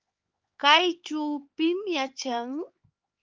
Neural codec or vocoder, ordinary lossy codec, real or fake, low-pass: codec, 16 kHz, 4 kbps, X-Codec, HuBERT features, trained on LibriSpeech; Opus, 32 kbps; fake; 7.2 kHz